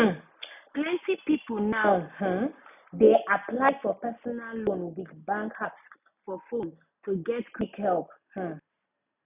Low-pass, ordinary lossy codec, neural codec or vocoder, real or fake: 3.6 kHz; none; none; real